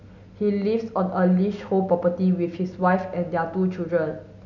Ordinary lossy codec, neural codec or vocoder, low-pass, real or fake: none; none; 7.2 kHz; real